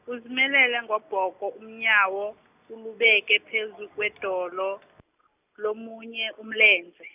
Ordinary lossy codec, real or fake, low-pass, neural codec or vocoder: none; real; 3.6 kHz; none